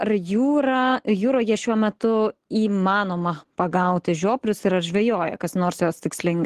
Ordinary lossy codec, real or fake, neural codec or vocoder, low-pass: Opus, 16 kbps; real; none; 14.4 kHz